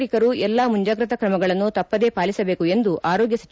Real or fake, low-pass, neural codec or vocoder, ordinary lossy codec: real; none; none; none